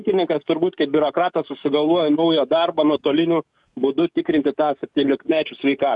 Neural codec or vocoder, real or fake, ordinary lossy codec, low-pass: codec, 44.1 kHz, 7.8 kbps, DAC; fake; MP3, 96 kbps; 10.8 kHz